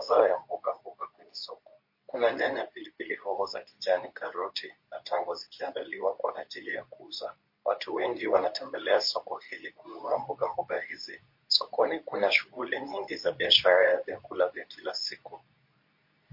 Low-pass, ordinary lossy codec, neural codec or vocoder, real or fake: 5.4 kHz; MP3, 32 kbps; codec, 24 kHz, 0.9 kbps, WavTokenizer, medium speech release version 2; fake